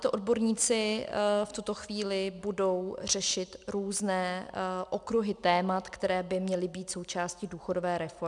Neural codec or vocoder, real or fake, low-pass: none; real; 10.8 kHz